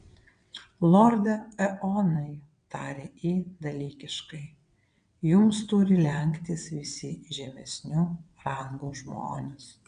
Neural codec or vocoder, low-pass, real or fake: vocoder, 22.05 kHz, 80 mel bands, WaveNeXt; 9.9 kHz; fake